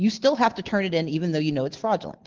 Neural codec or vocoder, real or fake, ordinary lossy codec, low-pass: none; real; Opus, 16 kbps; 7.2 kHz